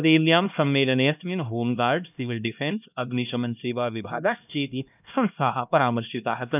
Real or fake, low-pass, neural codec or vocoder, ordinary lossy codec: fake; 3.6 kHz; codec, 16 kHz, 1 kbps, X-Codec, HuBERT features, trained on LibriSpeech; none